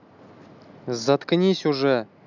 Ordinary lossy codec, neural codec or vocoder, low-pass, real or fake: none; none; 7.2 kHz; real